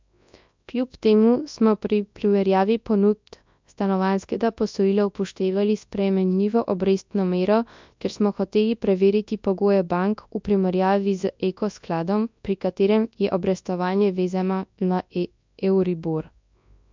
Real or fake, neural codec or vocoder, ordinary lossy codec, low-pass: fake; codec, 24 kHz, 0.9 kbps, WavTokenizer, large speech release; MP3, 64 kbps; 7.2 kHz